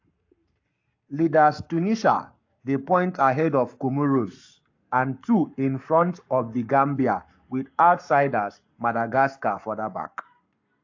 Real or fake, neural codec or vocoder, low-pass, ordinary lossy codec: fake; codec, 44.1 kHz, 7.8 kbps, Pupu-Codec; 7.2 kHz; AAC, 48 kbps